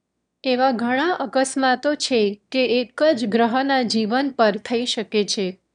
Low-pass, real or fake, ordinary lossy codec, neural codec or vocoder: 9.9 kHz; fake; none; autoencoder, 22.05 kHz, a latent of 192 numbers a frame, VITS, trained on one speaker